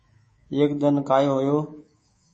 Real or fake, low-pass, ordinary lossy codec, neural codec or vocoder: fake; 10.8 kHz; MP3, 32 kbps; codec, 24 kHz, 3.1 kbps, DualCodec